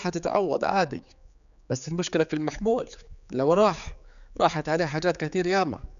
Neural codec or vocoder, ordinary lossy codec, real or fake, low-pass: codec, 16 kHz, 4 kbps, X-Codec, HuBERT features, trained on general audio; none; fake; 7.2 kHz